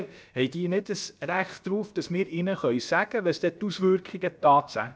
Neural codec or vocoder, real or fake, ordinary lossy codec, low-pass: codec, 16 kHz, about 1 kbps, DyCAST, with the encoder's durations; fake; none; none